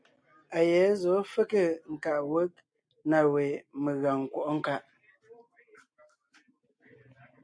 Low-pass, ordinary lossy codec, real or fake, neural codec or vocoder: 9.9 kHz; MP3, 64 kbps; real; none